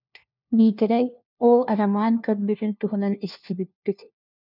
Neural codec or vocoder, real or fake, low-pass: codec, 16 kHz, 1 kbps, FunCodec, trained on LibriTTS, 50 frames a second; fake; 5.4 kHz